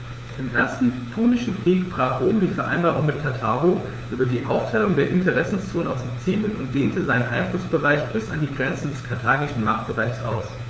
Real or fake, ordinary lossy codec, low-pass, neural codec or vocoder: fake; none; none; codec, 16 kHz, 4 kbps, FunCodec, trained on LibriTTS, 50 frames a second